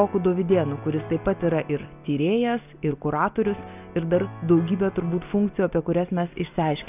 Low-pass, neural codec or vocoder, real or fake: 3.6 kHz; none; real